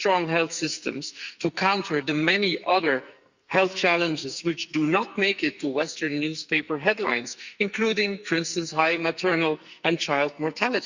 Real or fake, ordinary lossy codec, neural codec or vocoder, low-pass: fake; Opus, 64 kbps; codec, 44.1 kHz, 2.6 kbps, SNAC; 7.2 kHz